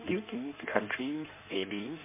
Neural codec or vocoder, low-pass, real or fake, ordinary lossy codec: codec, 16 kHz in and 24 kHz out, 1.1 kbps, FireRedTTS-2 codec; 3.6 kHz; fake; MP3, 32 kbps